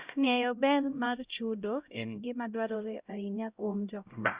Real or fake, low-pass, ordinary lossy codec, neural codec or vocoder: fake; 3.6 kHz; Opus, 64 kbps; codec, 16 kHz, 0.5 kbps, X-Codec, HuBERT features, trained on LibriSpeech